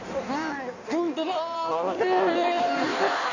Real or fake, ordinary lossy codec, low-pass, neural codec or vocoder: fake; none; 7.2 kHz; codec, 16 kHz in and 24 kHz out, 1.1 kbps, FireRedTTS-2 codec